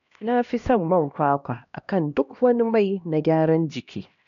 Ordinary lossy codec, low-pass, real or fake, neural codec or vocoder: none; 7.2 kHz; fake; codec, 16 kHz, 1 kbps, X-Codec, HuBERT features, trained on LibriSpeech